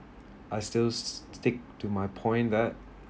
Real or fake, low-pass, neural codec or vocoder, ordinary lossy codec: real; none; none; none